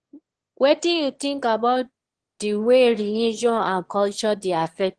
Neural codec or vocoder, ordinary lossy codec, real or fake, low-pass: autoencoder, 22.05 kHz, a latent of 192 numbers a frame, VITS, trained on one speaker; Opus, 16 kbps; fake; 9.9 kHz